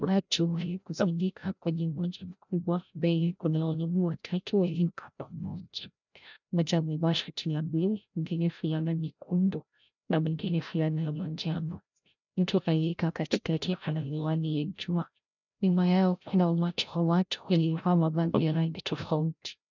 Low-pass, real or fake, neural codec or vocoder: 7.2 kHz; fake; codec, 16 kHz, 0.5 kbps, FreqCodec, larger model